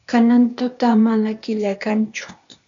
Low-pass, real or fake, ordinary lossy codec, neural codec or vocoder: 7.2 kHz; fake; MP3, 64 kbps; codec, 16 kHz, 0.8 kbps, ZipCodec